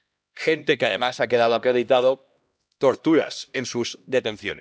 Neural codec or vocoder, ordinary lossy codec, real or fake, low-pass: codec, 16 kHz, 1 kbps, X-Codec, HuBERT features, trained on LibriSpeech; none; fake; none